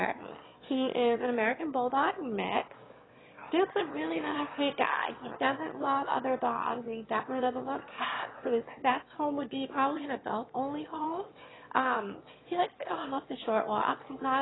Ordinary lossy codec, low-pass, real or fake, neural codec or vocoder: AAC, 16 kbps; 7.2 kHz; fake; autoencoder, 22.05 kHz, a latent of 192 numbers a frame, VITS, trained on one speaker